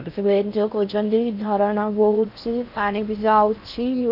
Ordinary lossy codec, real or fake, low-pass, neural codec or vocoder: none; fake; 5.4 kHz; codec, 16 kHz in and 24 kHz out, 0.6 kbps, FocalCodec, streaming, 4096 codes